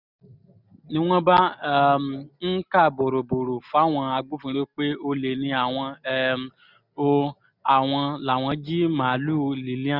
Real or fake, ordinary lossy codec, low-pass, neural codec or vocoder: real; none; 5.4 kHz; none